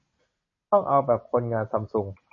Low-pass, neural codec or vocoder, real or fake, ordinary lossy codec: 7.2 kHz; none; real; MP3, 32 kbps